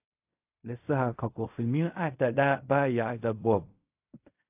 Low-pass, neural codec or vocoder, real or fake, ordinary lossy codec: 3.6 kHz; codec, 16 kHz in and 24 kHz out, 0.4 kbps, LongCat-Audio-Codec, fine tuned four codebook decoder; fake; AAC, 32 kbps